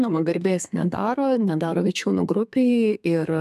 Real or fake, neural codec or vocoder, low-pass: fake; codec, 32 kHz, 1.9 kbps, SNAC; 14.4 kHz